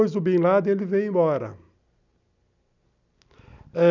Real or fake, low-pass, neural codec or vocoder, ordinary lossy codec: real; 7.2 kHz; none; none